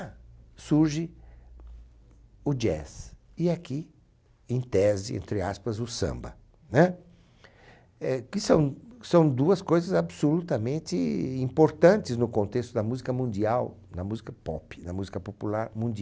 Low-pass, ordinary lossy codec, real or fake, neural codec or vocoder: none; none; real; none